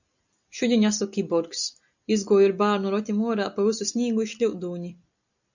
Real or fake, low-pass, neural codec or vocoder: real; 7.2 kHz; none